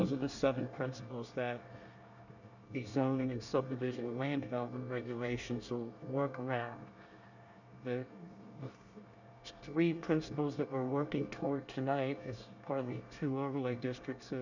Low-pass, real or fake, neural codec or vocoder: 7.2 kHz; fake; codec, 24 kHz, 1 kbps, SNAC